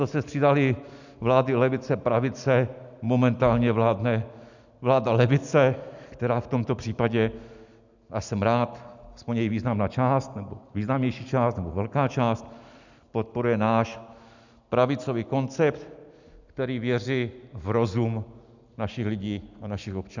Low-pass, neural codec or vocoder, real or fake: 7.2 kHz; none; real